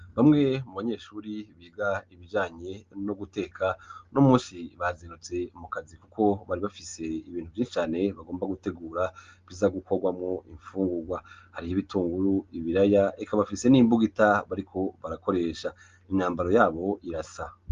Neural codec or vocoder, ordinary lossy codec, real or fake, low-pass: none; Opus, 32 kbps; real; 7.2 kHz